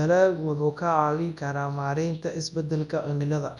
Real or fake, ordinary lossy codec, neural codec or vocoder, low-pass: fake; none; codec, 24 kHz, 0.9 kbps, WavTokenizer, large speech release; 10.8 kHz